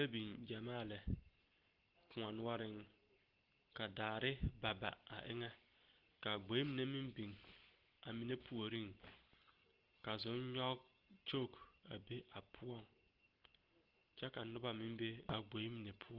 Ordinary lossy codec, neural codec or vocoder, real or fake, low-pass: Opus, 16 kbps; none; real; 5.4 kHz